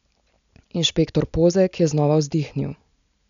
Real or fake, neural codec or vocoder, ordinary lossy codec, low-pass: real; none; none; 7.2 kHz